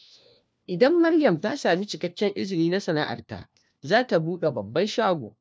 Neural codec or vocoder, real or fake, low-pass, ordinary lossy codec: codec, 16 kHz, 1 kbps, FunCodec, trained on LibriTTS, 50 frames a second; fake; none; none